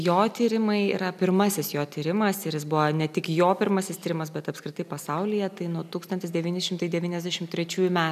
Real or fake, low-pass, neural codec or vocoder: real; 14.4 kHz; none